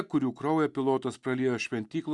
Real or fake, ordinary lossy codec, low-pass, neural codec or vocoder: real; Opus, 64 kbps; 10.8 kHz; none